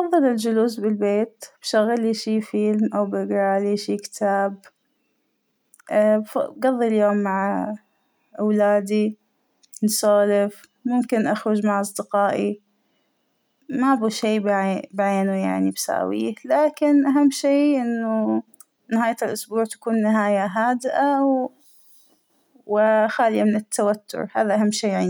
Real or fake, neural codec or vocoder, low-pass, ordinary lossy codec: real; none; none; none